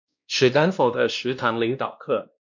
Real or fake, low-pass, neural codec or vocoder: fake; 7.2 kHz; codec, 16 kHz, 1 kbps, X-Codec, WavLM features, trained on Multilingual LibriSpeech